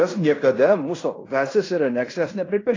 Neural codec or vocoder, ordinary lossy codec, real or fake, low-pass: codec, 16 kHz in and 24 kHz out, 0.9 kbps, LongCat-Audio-Codec, fine tuned four codebook decoder; AAC, 32 kbps; fake; 7.2 kHz